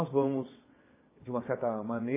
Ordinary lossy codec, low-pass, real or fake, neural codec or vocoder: MP3, 16 kbps; 3.6 kHz; fake; vocoder, 22.05 kHz, 80 mel bands, WaveNeXt